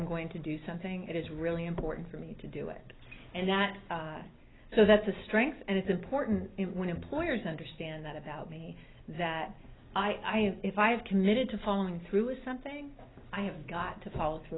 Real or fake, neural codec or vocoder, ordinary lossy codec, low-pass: real; none; AAC, 16 kbps; 7.2 kHz